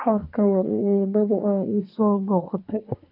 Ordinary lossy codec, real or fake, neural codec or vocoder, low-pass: none; fake; codec, 24 kHz, 1 kbps, SNAC; 5.4 kHz